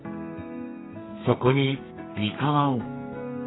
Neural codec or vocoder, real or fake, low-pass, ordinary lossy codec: codec, 32 kHz, 1.9 kbps, SNAC; fake; 7.2 kHz; AAC, 16 kbps